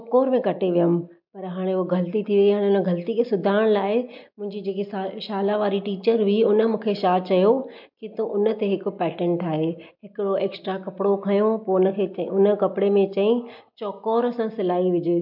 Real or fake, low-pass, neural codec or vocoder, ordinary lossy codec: real; 5.4 kHz; none; MP3, 48 kbps